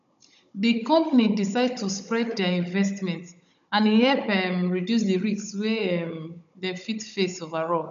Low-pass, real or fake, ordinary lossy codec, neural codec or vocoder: 7.2 kHz; fake; none; codec, 16 kHz, 16 kbps, FunCodec, trained on Chinese and English, 50 frames a second